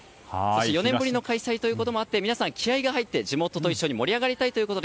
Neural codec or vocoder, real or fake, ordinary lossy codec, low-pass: none; real; none; none